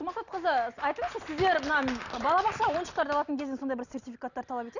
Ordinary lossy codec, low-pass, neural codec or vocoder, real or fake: none; 7.2 kHz; none; real